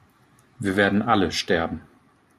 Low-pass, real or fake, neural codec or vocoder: 14.4 kHz; real; none